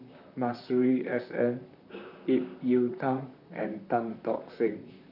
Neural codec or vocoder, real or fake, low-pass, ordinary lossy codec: vocoder, 44.1 kHz, 128 mel bands, Pupu-Vocoder; fake; 5.4 kHz; none